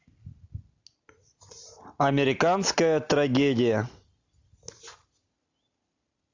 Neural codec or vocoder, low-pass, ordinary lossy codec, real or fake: none; 7.2 kHz; AAC, 48 kbps; real